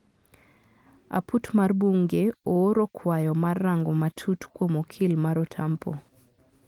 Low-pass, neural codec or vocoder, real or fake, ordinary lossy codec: 19.8 kHz; none; real; Opus, 24 kbps